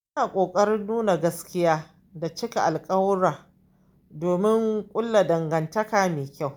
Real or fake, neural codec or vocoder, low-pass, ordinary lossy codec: real; none; none; none